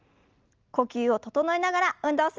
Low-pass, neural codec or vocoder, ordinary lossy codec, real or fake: 7.2 kHz; none; Opus, 24 kbps; real